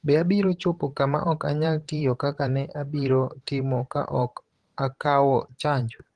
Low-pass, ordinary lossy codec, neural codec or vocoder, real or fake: 10.8 kHz; Opus, 16 kbps; vocoder, 44.1 kHz, 128 mel bands every 512 samples, BigVGAN v2; fake